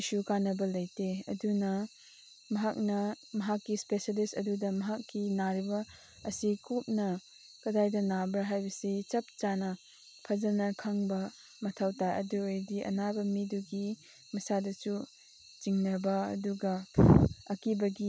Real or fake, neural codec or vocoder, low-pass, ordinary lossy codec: real; none; none; none